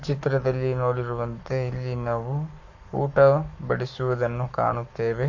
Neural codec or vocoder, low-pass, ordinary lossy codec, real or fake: codec, 44.1 kHz, 7.8 kbps, Pupu-Codec; 7.2 kHz; none; fake